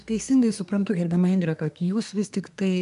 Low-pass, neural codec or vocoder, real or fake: 10.8 kHz; codec, 24 kHz, 1 kbps, SNAC; fake